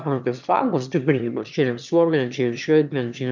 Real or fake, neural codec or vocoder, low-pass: fake; autoencoder, 22.05 kHz, a latent of 192 numbers a frame, VITS, trained on one speaker; 7.2 kHz